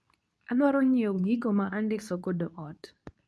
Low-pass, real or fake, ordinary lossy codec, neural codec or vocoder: none; fake; none; codec, 24 kHz, 0.9 kbps, WavTokenizer, medium speech release version 2